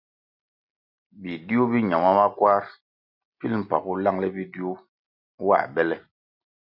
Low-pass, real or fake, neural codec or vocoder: 5.4 kHz; real; none